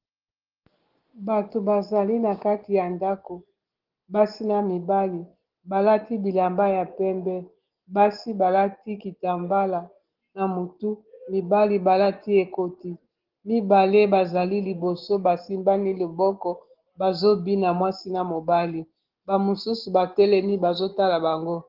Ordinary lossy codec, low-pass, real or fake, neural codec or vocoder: Opus, 16 kbps; 5.4 kHz; real; none